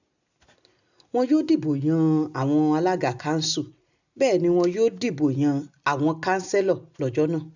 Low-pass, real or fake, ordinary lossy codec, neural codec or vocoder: 7.2 kHz; real; none; none